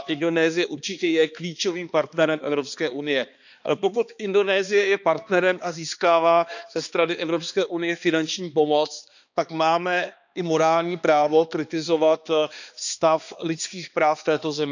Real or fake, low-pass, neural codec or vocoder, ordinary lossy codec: fake; 7.2 kHz; codec, 16 kHz, 2 kbps, X-Codec, HuBERT features, trained on balanced general audio; none